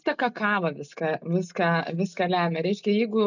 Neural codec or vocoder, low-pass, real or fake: none; 7.2 kHz; real